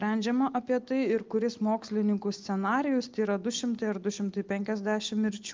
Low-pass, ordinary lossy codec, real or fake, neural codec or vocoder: 7.2 kHz; Opus, 32 kbps; real; none